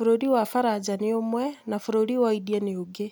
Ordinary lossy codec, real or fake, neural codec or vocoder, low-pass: none; real; none; none